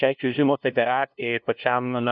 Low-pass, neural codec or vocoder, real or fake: 7.2 kHz; codec, 16 kHz, 0.5 kbps, FunCodec, trained on LibriTTS, 25 frames a second; fake